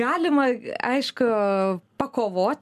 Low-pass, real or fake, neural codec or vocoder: 14.4 kHz; real; none